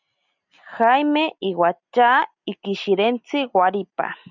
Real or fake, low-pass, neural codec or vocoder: real; 7.2 kHz; none